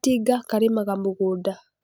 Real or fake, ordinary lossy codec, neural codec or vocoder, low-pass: real; none; none; none